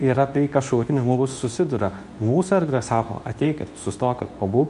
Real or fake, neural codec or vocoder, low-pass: fake; codec, 24 kHz, 0.9 kbps, WavTokenizer, medium speech release version 2; 10.8 kHz